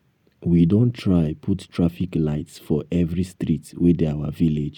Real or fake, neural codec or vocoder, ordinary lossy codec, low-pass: real; none; none; 19.8 kHz